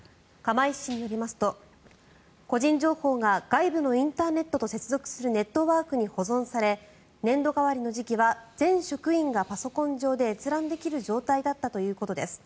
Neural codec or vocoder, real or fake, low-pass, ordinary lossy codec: none; real; none; none